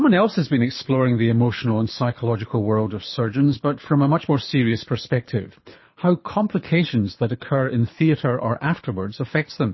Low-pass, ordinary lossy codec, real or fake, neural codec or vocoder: 7.2 kHz; MP3, 24 kbps; fake; codec, 24 kHz, 6 kbps, HILCodec